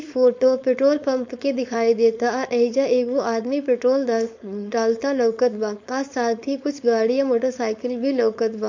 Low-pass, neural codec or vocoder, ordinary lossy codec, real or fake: 7.2 kHz; codec, 16 kHz, 4.8 kbps, FACodec; MP3, 48 kbps; fake